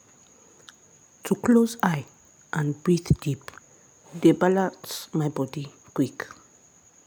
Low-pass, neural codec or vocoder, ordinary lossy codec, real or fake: none; none; none; real